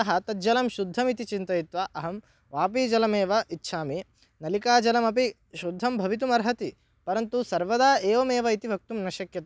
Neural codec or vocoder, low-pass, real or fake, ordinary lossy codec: none; none; real; none